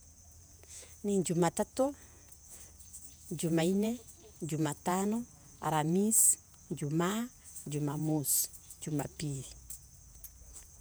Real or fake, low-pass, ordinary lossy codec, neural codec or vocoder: fake; none; none; vocoder, 48 kHz, 128 mel bands, Vocos